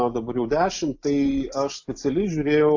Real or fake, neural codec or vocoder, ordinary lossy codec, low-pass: real; none; AAC, 48 kbps; 7.2 kHz